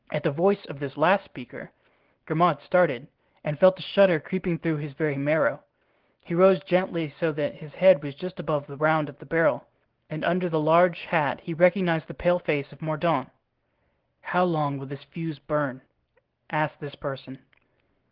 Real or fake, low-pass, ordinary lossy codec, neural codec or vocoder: real; 5.4 kHz; Opus, 16 kbps; none